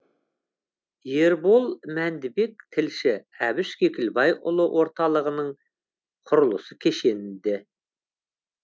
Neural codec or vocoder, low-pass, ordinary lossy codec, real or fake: none; none; none; real